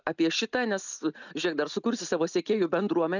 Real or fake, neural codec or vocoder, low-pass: real; none; 7.2 kHz